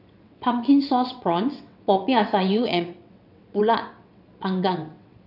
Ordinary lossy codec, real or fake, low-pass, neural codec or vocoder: none; fake; 5.4 kHz; vocoder, 22.05 kHz, 80 mel bands, WaveNeXt